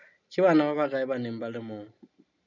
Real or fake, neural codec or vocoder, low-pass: real; none; 7.2 kHz